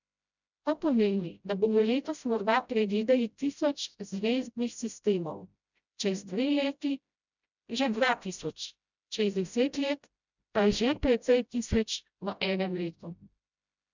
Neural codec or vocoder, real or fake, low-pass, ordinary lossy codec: codec, 16 kHz, 0.5 kbps, FreqCodec, smaller model; fake; 7.2 kHz; none